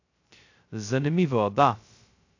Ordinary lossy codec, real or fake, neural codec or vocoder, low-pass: AAC, 48 kbps; fake; codec, 16 kHz, 0.2 kbps, FocalCodec; 7.2 kHz